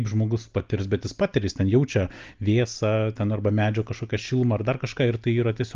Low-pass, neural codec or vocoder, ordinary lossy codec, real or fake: 7.2 kHz; none; Opus, 24 kbps; real